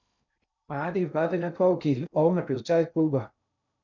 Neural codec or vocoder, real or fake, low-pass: codec, 16 kHz in and 24 kHz out, 0.6 kbps, FocalCodec, streaming, 2048 codes; fake; 7.2 kHz